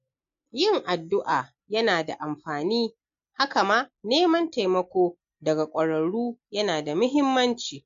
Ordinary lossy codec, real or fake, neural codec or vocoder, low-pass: MP3, 48 kbps; real; none; 7.2 kHz